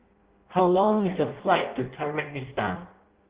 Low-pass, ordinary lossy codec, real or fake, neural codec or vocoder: 3.6 kHz; Opus, 16 kbps; fake; codec, 16 kHz in and 24 kHz out, 0.6 kbps, FireRedTTS-2 codec